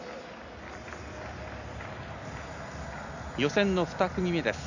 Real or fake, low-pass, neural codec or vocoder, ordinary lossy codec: real; 7.2 kHz; none; none